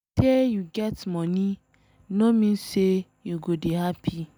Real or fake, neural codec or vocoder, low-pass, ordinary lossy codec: real; none; none; none